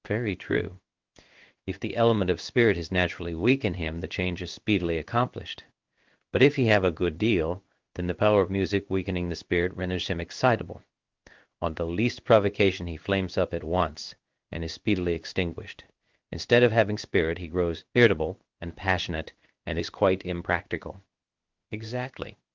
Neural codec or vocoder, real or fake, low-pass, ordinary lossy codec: codec, 16 kHz in and 24 kHz out, 1 kbps, XY-Tokenizer; fake; 7.2 kHz; Opus, 32 kbps